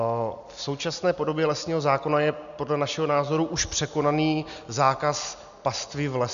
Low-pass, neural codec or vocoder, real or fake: 7.2 kHz; none; real